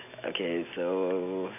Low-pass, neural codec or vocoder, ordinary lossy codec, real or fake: 3.6 kHz; none; none; real